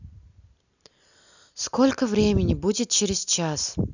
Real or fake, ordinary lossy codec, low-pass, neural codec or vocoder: real; none; 7.2 kHz; none